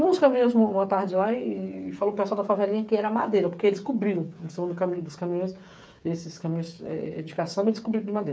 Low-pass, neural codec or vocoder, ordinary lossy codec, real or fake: none; codec, 16 kHz, 8 kbps, FreqCodec, smaller model; none; fake